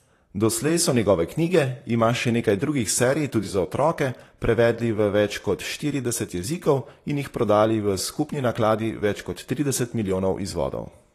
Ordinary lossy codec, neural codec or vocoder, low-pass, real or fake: AAC, 48 kbps; vocoder, 44.1 kHz, 128 mel bands, Pupu-Vocoder; 14.4 kHz; fake